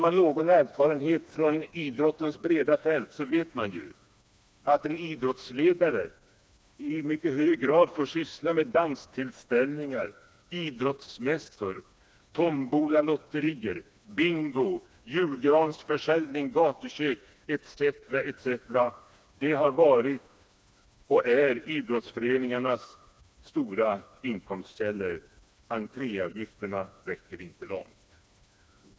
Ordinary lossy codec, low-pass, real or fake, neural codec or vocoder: none; none; fake; codec, 16 kHz, 2 kbps, FreqCodec, smaller model